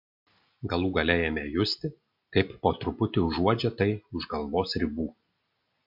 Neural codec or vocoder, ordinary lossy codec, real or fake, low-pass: none; MP3, 48 kbps; real; 5.4 kHz